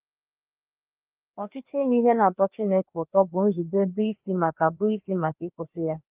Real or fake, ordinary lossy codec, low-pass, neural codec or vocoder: fake; Opus, 24 kbps; 3.6 kHz; codec, 16 kHz, 2 kbps, FreqCodec, larger model